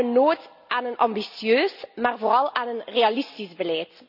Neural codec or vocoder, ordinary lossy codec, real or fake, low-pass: none; none; real; 5.4 kHz